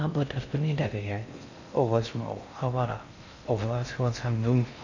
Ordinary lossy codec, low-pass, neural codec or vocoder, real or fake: none; 7.2 kHz; codec, 16 kHz in and 24 kHz out, 0.6 kbps, FocalCodec, streaming, 4096 codes; fake